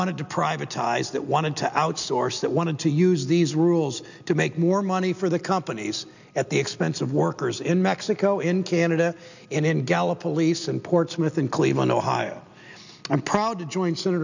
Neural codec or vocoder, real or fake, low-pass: none; real; 7.2 kHz